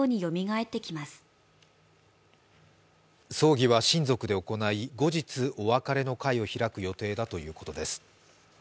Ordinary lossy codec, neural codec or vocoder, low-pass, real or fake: none; none; none; real